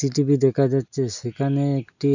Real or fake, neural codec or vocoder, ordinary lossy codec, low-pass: real; none; none; 7.2 kHz